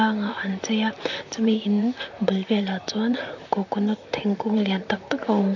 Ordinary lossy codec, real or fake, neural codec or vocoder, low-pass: AAC, 48 kbps; fake; vocoder, 44.1 kHz, 128 mel bands, Pupu-Vocoder; 7.2 kHz